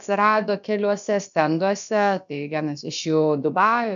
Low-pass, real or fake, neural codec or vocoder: 7.2 kHz; fake; codec, 16 kHz, about 1 kbps, DyCAST, with the encoder's durations